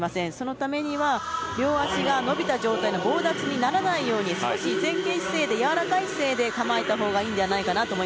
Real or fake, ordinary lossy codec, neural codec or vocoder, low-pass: real; none; none; none